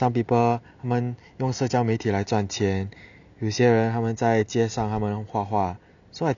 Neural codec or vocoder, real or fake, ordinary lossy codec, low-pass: none; real; none; 7.2 kHz